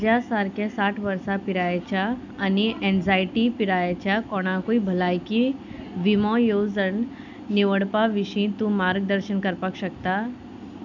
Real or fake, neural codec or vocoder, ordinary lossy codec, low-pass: real; none; none; 7.2 kHz